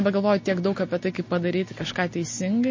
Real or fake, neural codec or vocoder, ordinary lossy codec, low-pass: real; none; MP3, 32 kbps; 7.2 kHz